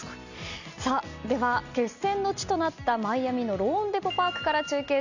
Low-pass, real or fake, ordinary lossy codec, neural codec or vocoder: 7.2 kHz; real; none; none